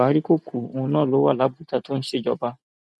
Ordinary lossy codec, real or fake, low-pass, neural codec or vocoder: none; real; none; none